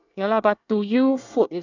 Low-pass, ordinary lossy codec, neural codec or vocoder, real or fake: 7.2 kHz; none; codec, 24 kHz, 1 kbps, SNAC; fake